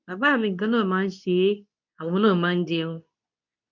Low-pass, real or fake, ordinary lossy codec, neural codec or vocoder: 7.2 kHz; fake; none; codec, 24 kHz, 0.9 kbps, WavTokenizer, medium speech release version 2